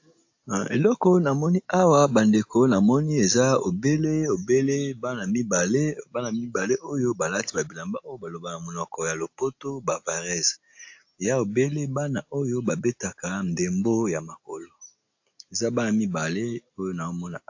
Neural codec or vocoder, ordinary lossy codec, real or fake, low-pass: none; AAC, 48 kbps; real; 7.2 kHz